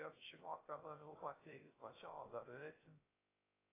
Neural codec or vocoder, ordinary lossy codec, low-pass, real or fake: codec, 16 kHz, 0.3 kbps, FocalCodec; AAC, 16 kbps; 3.6 kHz; fake